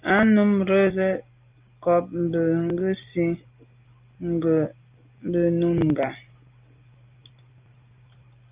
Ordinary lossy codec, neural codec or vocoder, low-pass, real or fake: Opus, 24 kbps; none; 3.6 kHz; real